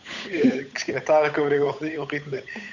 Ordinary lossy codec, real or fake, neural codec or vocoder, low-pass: none; fake; codec, 16 kHz, 8 kbps, FunCodec, trained on Chinese and English, 25 frames a second; 7.2 kHz